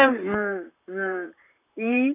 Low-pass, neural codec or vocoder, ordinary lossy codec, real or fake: 3.6 kHz; vocoder, 44.1 kHz, 128 mel bands, Pupu-Vocoder; none; fake